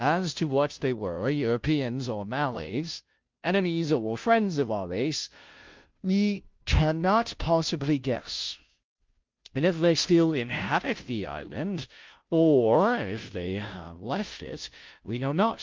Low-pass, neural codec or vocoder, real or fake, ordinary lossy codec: 7.2 kHz; codec, 16 kHz, 0.5 kbps, FunCodec, trained on Chinese and English, 25 frames a second; fake; Opus, 24 kbps